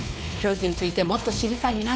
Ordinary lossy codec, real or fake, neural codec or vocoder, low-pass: none; fake; codec, 16 kHz, 2 kbps, X-Codec, WavLM features, trained on Multilingual LibriSpeech; none